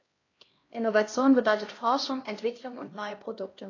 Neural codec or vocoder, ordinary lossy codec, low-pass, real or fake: codec, 16 kHz, 1 kbps, X-Codec, HuBERT features, trained on LibriSpeech; AAC, 32 kbps; 7.2 kHz; fake